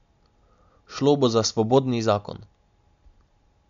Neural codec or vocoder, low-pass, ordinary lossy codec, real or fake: none; 7.2 kHz; MP3, 48 kbps; real